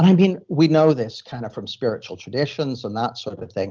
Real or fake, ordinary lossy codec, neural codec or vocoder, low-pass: real; Opus, 24 kbps; none; 7.2 kHz